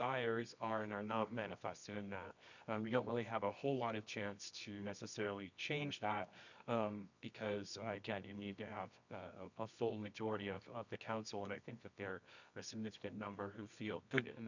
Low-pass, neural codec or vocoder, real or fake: 7.2 kHz; codec, 24 kHz, 0.9 kbps, WavTokenizer, medium music audio release; fake